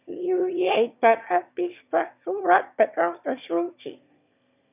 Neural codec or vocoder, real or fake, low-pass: autoencoder, 22.05 kHz, a latent of 192 numbers a frame, VITS, trained on one speaker; fake; 3.6 kHz